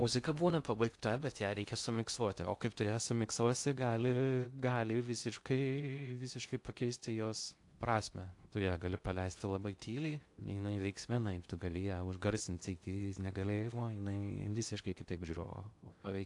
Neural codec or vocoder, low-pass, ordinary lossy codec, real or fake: codec, 16 kHz in and 24 kHz out, 0.6 kbps, FocalCodec, streaming, 2048 codes; 10.8 kHz; AAC, 64 kbps; fake